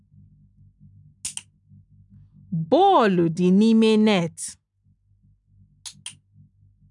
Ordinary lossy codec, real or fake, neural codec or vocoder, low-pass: none; real; none; 10.8 kHz